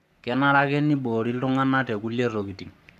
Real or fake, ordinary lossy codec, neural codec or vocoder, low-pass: fake; none; codec, 44.1 kHz, 7.8 kbps, Pupu-Codec; 14.4 kHz